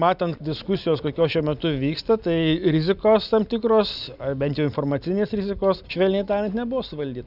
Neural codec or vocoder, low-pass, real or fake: none; 5.4 kHz; real